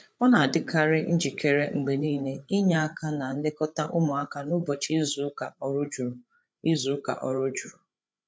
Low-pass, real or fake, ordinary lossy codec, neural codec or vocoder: none; fake; none; codec, 16 kHz, 8 kbps, FreqCodec, larger model